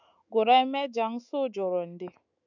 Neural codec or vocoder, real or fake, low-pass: autoencoder, 48 kHz, 128 numbers a frame, DAC-VAE, trained on Japanese speech; fake; 7.2 kHz